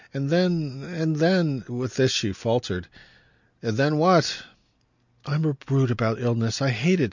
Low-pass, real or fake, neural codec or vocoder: 7.2 kHz; real; none